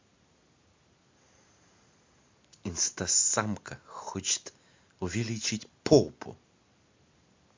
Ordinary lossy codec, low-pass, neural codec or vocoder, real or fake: MP3, 48 kbps; 7.2 kHz; none; real